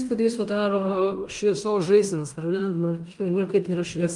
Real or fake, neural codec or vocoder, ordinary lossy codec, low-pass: fake; codec, 16 kHz in and 24 kHz out, 0.9 kbps, LongCat-Audio-Codec, fine tuned four codebook decoder; Opus, 32 kbps; 10.8 kHz